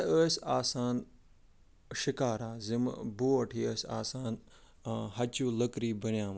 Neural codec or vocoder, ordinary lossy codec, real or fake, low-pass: none; none; real; none